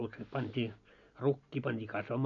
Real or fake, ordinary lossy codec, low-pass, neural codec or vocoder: real; none; 7.2 kHz; none